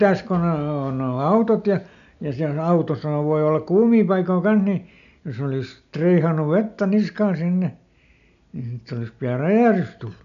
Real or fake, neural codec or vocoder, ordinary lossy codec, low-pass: real; none; none; 7.2 kHz